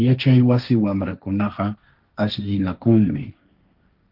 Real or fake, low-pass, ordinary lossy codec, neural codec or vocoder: fake; 5.4 kHz; Opus, 32 kbps; codec, 16 kHz, 1.1 kbps, Voila-Tokenizer